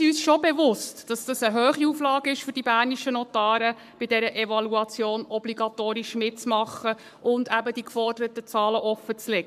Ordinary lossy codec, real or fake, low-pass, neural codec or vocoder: MP3, 96 kbps; fake; 14.4 kHz; codec, 44.1 kHz, 7.8 kbps, Pupu-Codec